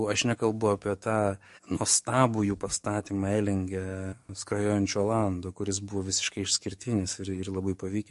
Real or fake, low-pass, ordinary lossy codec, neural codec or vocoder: fake; 14.4 kHz; MP3, 48 kbps; codec, 44.1 kHz, 7.8 kbps, DAC